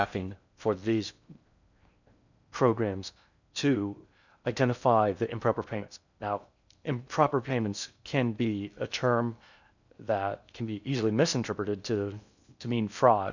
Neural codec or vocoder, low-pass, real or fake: codec, 16 kHz in and 24 kHz out, 0.6 kbps, FocalCodec, streaming, 4096 codes; 7.2 kHz; fake